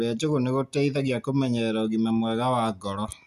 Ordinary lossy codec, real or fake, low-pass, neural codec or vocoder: none; real; 14.4 kHz; none